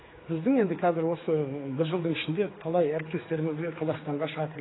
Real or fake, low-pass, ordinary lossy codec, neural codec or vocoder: fake; 7.2 kHz; AAC, 16 kbps; codec, 16 kHz, 4 kbps, X-Codec, HuBERT features, trained on general audio